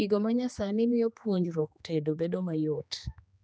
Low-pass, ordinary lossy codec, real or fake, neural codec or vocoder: none; none; fake; codec, 16 kHz, 2 kbps, X-Codec, HuBERT features, trained on general audio